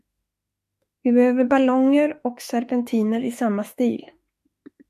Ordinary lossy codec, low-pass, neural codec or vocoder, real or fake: MP3, 64 kbps; 14.4 kHz; autoencoder, 48 kHz, 32 numbers a frame, DAC-VAE, trained on Japanese speech; fake